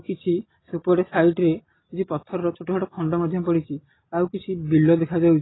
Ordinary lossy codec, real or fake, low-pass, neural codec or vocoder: AAC, 16 kbps; real; 7.2 kHz; none